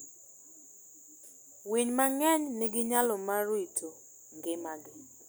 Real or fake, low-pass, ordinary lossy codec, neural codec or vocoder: real; none; none; none